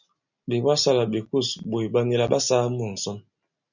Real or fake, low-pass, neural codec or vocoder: real; 7.2 kHz; none